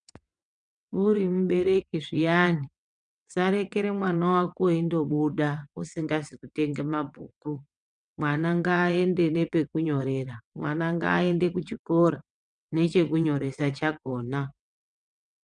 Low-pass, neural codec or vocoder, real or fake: 9.9 kHz; vocoder, 22.05 kHz, 80 mel bands, WaveNeXt; fake